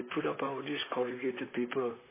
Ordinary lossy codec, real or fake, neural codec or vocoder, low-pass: MP3, 16 kbps; fake; vocoder, 44.1 kHz, 128 mel bands, Pupu-Vocoder; 3.6 kHz